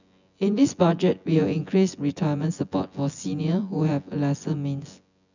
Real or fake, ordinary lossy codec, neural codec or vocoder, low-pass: fake; none; vocoder, 24 kHz, 100 mel bands, Vocos; 7.2 kHz